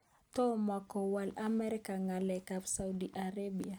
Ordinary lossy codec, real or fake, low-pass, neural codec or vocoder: none; real; none; none